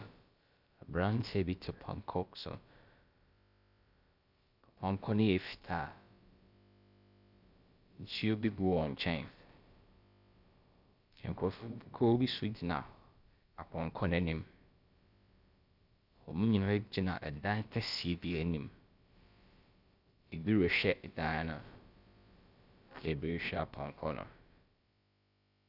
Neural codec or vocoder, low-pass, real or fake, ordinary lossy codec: codec, 16 kHz, about 1 kbps, DyCAST, with the encoder's durations; 5.4 kHz; fake; Opus, 64 kbps